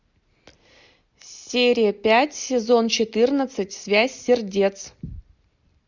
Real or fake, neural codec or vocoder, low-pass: real; none; 7.2 kHz